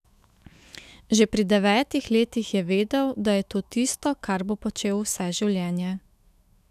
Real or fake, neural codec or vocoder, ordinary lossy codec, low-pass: fake; autoencoder, 48 kHz, 128 numbers a frame, DAC-VAE, trained on Japanese speech; none; 14.4 kHz